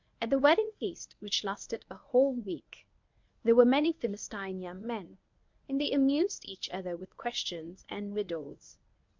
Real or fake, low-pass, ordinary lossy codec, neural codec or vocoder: fake; 7.2 kHz; MP3, 64 kbps; codec, 24 kHz, 0.9 kbps, WavTokenizer, medium speech release version 1